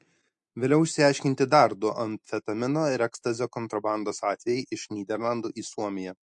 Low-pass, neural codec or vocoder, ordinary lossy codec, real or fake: 10.8 kHz; none; MP3, 48 kbps; real